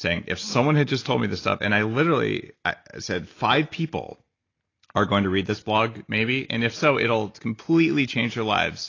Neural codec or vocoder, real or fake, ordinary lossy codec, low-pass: none; real; AAC, 32 kbps; 7.2 kHz